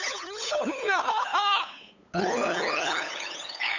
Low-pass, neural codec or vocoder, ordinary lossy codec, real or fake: 7.2 kHz; codec, 16 kHz, 8 kbps, FunCodec, trained on LibriTTS, 25 frames a second; none; fake